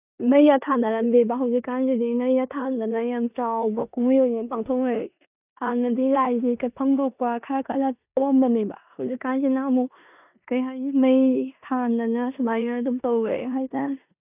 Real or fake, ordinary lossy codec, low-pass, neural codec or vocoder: fake; none; 3.6 kHz; codec, 16 kHz in and 24 kHz out, 0.9 kbps, LongCat-Audio-Codec, four codebook decoder